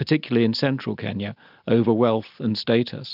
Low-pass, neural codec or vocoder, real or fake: 5.4 kHz; none; real